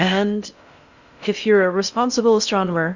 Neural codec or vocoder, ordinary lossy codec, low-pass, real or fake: codec, 16 kHz in and 24 kHz out, 0.6 kbps, FocalCodec, streaming, 4096 codes; Opus, 64 kbps; 7.2 kHz; fake